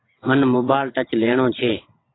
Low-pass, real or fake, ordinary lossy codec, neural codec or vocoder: 7.2 kHz; fake; AAC, 16 kbps; vocoder, 22.05 kHz, 80 mel bands, WaveNeXt